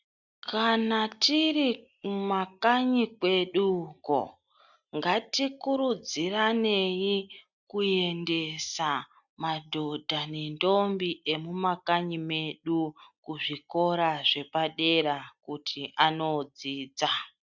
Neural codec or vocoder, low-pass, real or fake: none; 7.2 kHz; real